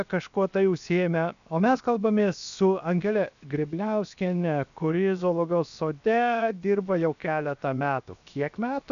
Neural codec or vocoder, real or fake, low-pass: codec, 16 kHz, 0.7 kbps, FocalCodec; fake; 7.2 kHz